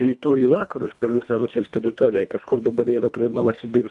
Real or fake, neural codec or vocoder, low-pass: fake; codec, 24 kHz, 1.5 kbps, HILCodec; 10.8 kHz